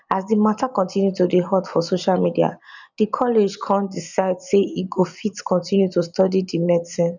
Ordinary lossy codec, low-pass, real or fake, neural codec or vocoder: none; 7.2 kHz; real; none